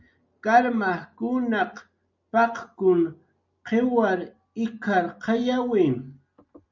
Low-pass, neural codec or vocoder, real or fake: 7.2 kHz; none; real